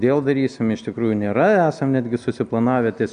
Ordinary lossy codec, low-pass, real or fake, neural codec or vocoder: AAC, 96 kbps; 9.9 kHz; real; none